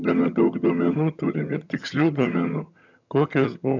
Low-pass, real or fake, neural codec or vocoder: 7.2 kHz; fake; vocoder, 22.05 kHz, 80 mel bands, HiFi-GAN